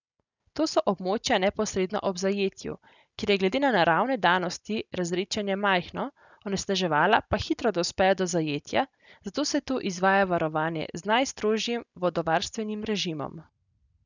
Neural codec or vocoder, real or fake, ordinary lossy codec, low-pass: none; real; none; 7.2 kHz